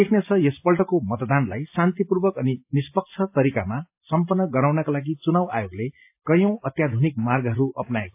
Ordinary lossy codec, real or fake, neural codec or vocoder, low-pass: AAC, 32 kbps; real; none; 3.6 kHz